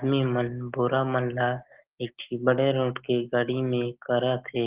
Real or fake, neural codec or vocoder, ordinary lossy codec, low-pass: real; none; Opus, 16 kbps; 3.6 kHz